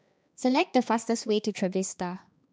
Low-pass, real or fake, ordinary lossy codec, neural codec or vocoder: none; fake; none; codec, 16 kHz, 2 kbps, X-Codec, HuBERT features, trained on balanced general audio